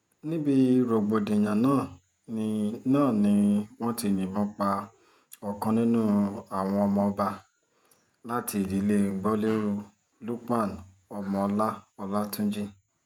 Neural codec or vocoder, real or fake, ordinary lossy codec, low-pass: vocoder, 48 kHz, 128 mel bands, Vocos; fake; none; 19.8 kHz